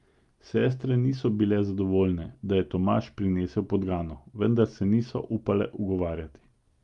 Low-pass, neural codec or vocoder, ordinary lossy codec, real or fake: 10.8 kHz; none; Opus, 32 kbps; real